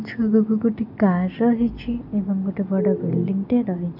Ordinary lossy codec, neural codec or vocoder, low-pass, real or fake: none; none; 5.4 kHz; real